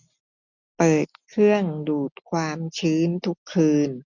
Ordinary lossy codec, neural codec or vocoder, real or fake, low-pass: none; none; real; 7.2 kHz